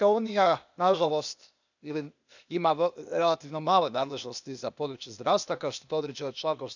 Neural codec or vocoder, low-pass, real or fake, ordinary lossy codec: codec, 16 kHz, 0.8 kbps, ZipCodec; 7.2 kHz; fake; none